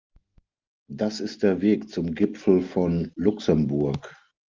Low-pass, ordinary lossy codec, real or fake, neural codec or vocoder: 7.2 kHz; Opus, 32 kbps; real; none